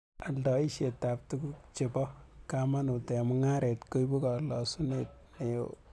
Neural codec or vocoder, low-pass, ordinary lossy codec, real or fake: none; none; none; real